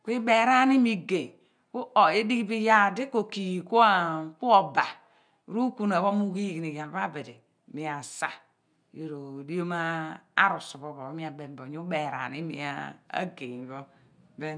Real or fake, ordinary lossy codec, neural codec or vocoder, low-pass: real; none; none; 9.9 kHz